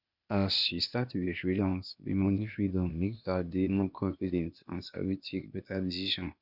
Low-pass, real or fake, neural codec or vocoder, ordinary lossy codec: 5.4 kHz; fake; codec, 16 kHz, 0.8 kbps, ZipCodec; none